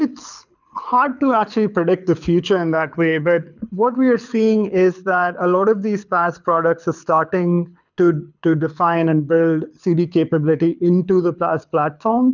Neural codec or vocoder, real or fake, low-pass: codec, 24 kHz, 6 kbps, HILCodec; fake; 7.2 kHz